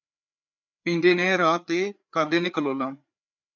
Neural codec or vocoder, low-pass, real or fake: codec, 16 kHz, 4 kbps, FreqCodec, larger model; 7.2 kHz; fake